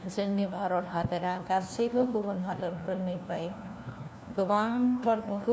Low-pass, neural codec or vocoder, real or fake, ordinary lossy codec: none; codec, 16 kHz, 1 kbps, FunCodec, trained on LibriTTS, 50 frames a second; fake; none